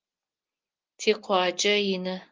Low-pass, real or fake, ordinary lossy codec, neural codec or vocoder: 7.2 kHz; real; Opus, 32 kbps; none